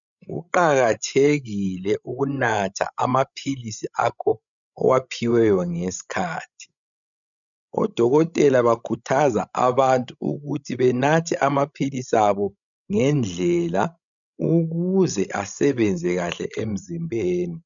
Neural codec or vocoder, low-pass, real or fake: codec, 16 kHz, 16 kbps, FreqCodec, larger model; 7.2 kHz; fake